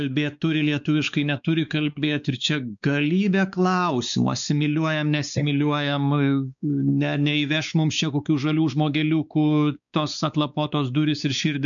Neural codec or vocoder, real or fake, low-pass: codec, 16 kHz, 4 kbps, X-Codec, WavLM features, trained on Multilingual LibriSpeech; fake; 7.2 kHz